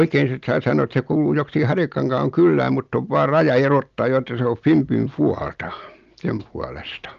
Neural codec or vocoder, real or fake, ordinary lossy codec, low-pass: none; real; Opus, 24 kbps; 7.2 kHz